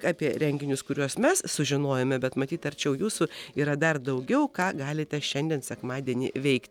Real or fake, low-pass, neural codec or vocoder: real; 19.8 kHz; none